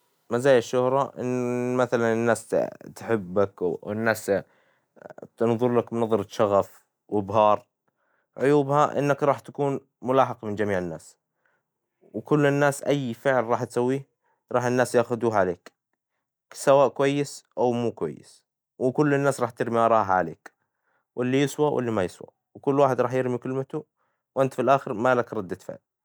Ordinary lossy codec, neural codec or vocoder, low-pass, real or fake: none; none; none; real